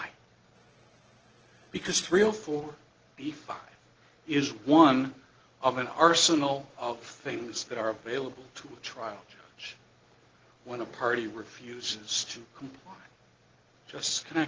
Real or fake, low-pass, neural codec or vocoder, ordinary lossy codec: real; 7.2 kHz; none; Opus, 16 kbps